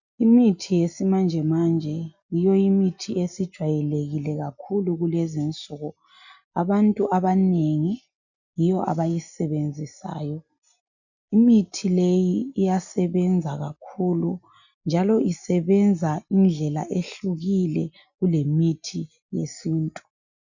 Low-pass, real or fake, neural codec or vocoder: 7.2 kHz; real; none